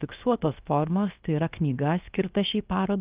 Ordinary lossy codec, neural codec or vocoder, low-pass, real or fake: Opus, 32 kbps; codec, 16 kHz, 0.7 kbps, FocalCodec; 3.6 kHz; fake